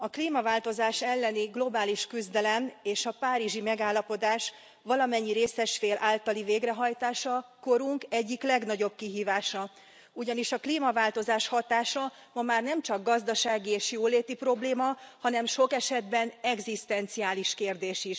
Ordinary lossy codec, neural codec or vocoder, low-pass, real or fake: none; none; none; real